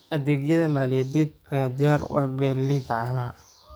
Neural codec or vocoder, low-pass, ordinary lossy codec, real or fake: codec, 44.1 kHz, 2.6 kbps, SNAC; none; none; fake